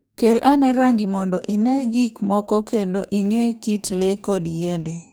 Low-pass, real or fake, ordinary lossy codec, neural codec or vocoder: none; fake; none; codec, 44.1 kHz, 2.6 kbps, DAC